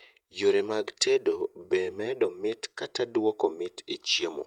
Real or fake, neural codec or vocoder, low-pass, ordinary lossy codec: fake; vocoder, 48 kHz, 128 mel bands, Vocos; 19.8 kHz; none